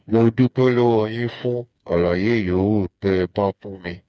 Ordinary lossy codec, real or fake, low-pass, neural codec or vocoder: none; fake; none; codec, 16 kHz, 4 kbps, FreqCodec, smaller model